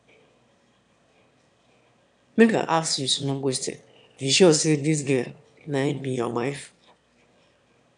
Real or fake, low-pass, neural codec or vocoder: fake; 9.9 kHz; autoencoder, 22.05 kHz, a latent of 192 numbers a frame, VITS, trained on one speaker